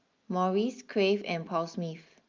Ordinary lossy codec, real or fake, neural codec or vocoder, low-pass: Opus, 24 kbps; real; none; 7.2 kHz